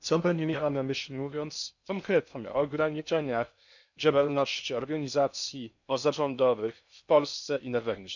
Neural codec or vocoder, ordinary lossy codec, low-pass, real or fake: codec, 16 kHz in and 24 kHz out, 0.6 kbps, FocalCodec, streaming, 2048 codes; none; 7.2 kHz; fake